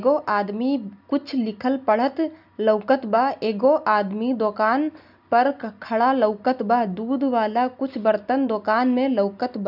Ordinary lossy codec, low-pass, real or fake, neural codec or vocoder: none; 5.4 kHz; real; none